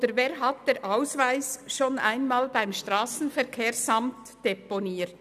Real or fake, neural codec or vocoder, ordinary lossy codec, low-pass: real; none; none; 14.4 kHz